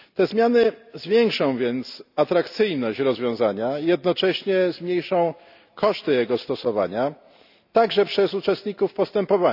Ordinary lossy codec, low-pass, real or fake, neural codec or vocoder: none; 5.4 kHz; real; none